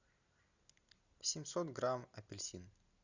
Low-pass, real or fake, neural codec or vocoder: 7.2 kHz; real; none